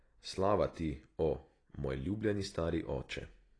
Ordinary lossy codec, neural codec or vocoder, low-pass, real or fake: AAC, 32 kbps; none; 9.9 kHz; real